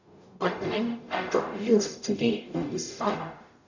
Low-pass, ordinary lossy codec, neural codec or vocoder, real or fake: 7.2 kHz; none; codec, 44.1 kHz, 0.9 kbps, DAC; fake